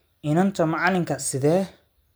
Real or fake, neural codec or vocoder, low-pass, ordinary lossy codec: real; none; none; none